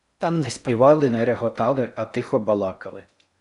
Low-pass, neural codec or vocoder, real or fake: 10.8 kHz; codec, 16 kHz in and 24 kHz out, 0.6 kbps, FocalCodec, streaming, 4096 codes; fake